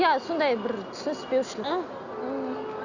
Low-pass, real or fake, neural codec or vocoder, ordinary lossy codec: 7.2 kHz; real; none; none